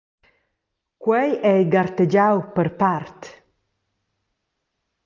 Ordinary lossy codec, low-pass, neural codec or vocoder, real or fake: Opus, 32 kbps; 7.2 kHz; none; real